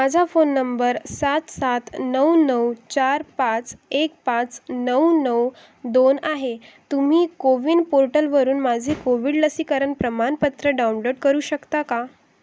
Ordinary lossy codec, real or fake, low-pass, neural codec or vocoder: none; real; none; none